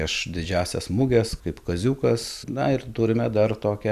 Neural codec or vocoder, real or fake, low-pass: none; real; 14.4 kHz